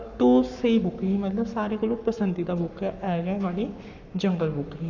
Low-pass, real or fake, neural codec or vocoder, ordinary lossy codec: 7.2 kHz; fake; codec, 44.1 kHz, 7.8 kbps, Pupu-Codec; none